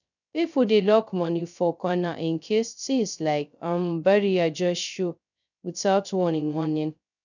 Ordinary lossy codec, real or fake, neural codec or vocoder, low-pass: none; fake; codec, 16 kHz, 0.2 kbps, FocalCodec; 7.2 kHz